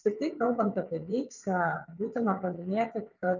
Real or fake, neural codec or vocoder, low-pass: fake; vocoder, 22.05 kHz, 80 mel bands, WaveNeXt; 7.2 kHz